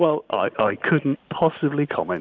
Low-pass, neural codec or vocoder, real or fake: 7.2 kHz; none; real